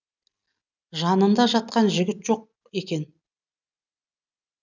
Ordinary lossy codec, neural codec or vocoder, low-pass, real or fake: none; none; 7.2 kHz; real